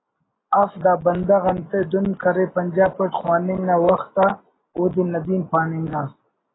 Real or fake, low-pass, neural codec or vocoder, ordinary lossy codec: real; 7.2 kHz; none; AAC, 16 kbps